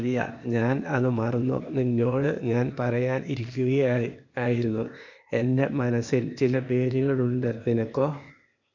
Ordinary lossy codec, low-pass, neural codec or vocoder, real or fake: none; 7.2 kHz; codec, 16 kHz, 0.8 kbps, ZipCodec; fake